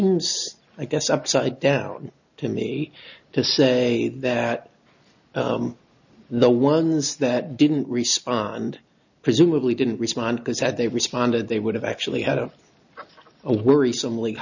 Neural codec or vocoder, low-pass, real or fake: none; 7.2 kHz; real